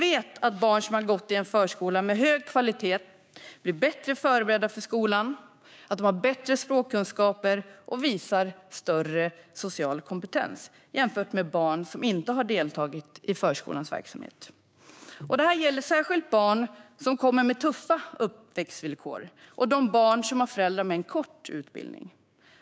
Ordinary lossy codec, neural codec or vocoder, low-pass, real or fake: none; codec, 16 kHz, 6 kbps, DAC; none; fake